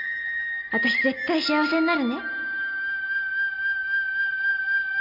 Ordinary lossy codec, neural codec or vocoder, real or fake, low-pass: MP3, 48 kbps; none; real; 5.4 kHz